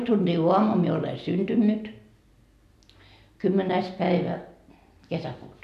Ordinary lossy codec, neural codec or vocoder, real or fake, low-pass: none; none; real; 14.4 kHz